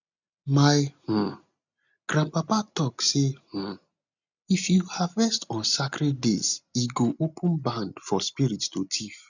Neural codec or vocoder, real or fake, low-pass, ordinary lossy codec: none; real; 7.2 kHz; none